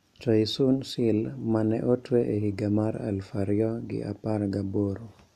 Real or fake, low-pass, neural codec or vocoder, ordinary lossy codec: real; 14.4 kHz; none; none